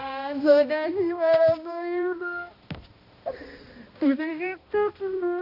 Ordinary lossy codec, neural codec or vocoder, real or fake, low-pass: none; codec, 16 kHz, 1 kbps, X-Codec, HuBERT features, trained on balanced general audio; fake; 5.4 kHz